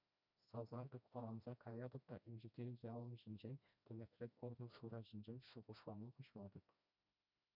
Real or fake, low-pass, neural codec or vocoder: fake; 5.4 kHz; codec, 16 kHz, 1 kbps, FreqCodec, smaller model